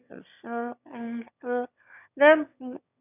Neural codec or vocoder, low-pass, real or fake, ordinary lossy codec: autoencoder, 22.05 kHz, a latent of 192 numbers a frame, VITS, trained on one speaker; 3.6 kHz; fake; none